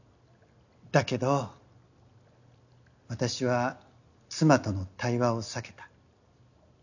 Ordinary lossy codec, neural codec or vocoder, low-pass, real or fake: none; none; 7.2 kHz; real